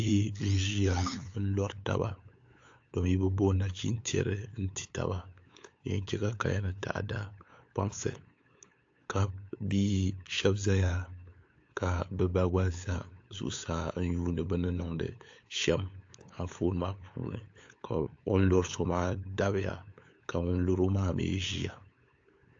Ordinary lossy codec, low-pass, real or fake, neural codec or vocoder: AAC, 48 kbps; 7.2 kHz; fake; codec, 16 kHz, 8 kbps, FunCodec, trained on LibriTTS, 25 frames a second